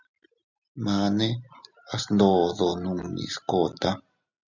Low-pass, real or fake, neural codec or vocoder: 7.2 kHz; real; none